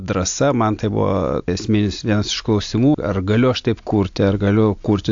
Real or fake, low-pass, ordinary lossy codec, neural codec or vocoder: real; 7.2 kHz; AAC, 64 kbps; none